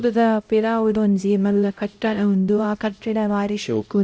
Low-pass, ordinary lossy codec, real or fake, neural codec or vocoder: none; none; fake; codec, 16 kHz, 0.5 kbps, X-Codec, HuBERT features, trained on LibriSpeech